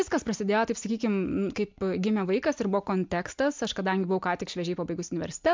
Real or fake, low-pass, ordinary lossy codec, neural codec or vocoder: real; 7.2 kHz; MP3, 64 kbps; none